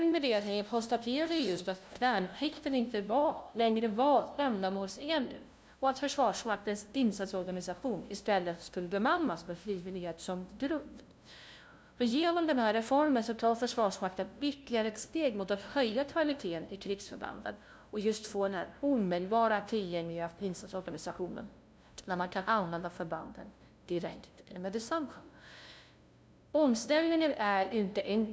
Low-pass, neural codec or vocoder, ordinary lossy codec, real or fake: none; codec, 16 kHz, 0.5 kbps, FunCodec, trained on LibriTTS, 25 frames a second; none; fake